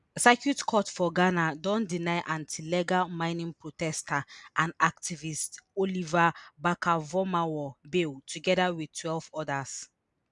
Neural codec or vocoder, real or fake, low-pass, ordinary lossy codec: none; real; 10.8 kHz; none